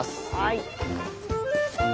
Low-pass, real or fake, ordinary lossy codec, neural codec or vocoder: none; real; none; none